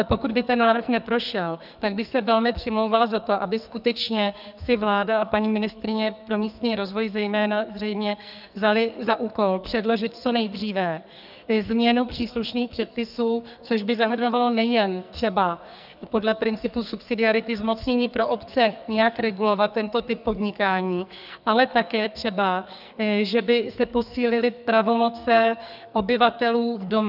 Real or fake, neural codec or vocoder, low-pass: fake; codec, 44.1 kHz, 2.6 kbps, SNAC; 5.4 kHz